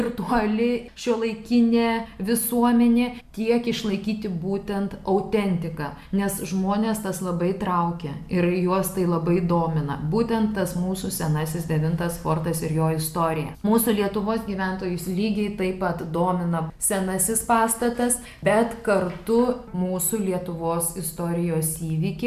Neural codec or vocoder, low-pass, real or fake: none; 14.4 kHz; real